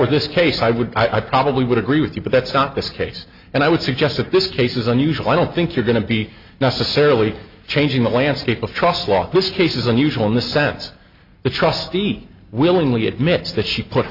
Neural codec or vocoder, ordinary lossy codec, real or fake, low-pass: none; MP3, 48 kbps; real; 5.4 kHz